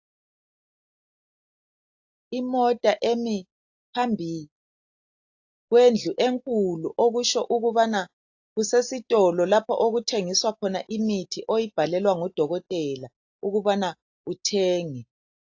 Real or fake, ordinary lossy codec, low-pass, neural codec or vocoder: real; AAC, 48 kbps; 7.2 kHz; none